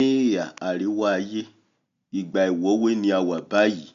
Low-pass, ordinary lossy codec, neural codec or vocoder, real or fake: 7.2 kHz; MP3, 64 kbps; none; real